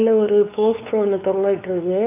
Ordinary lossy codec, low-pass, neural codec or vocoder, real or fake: none; 3.6 kHz; codec, 16 kHz, 4 kbps, X-Codec, WavLM features, trained on Multilingual LibriSpeech; fake